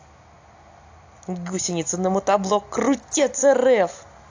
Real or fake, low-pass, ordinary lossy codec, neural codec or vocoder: real; 7.2 kHz; AAC, 48 kbps; none